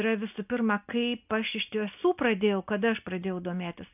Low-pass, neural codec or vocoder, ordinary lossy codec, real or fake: 3.6 kHz; none; AAC, 32 kbps; real